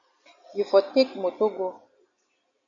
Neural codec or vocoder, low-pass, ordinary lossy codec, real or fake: none; 7.2 kHz; AAC, 64 kbps; real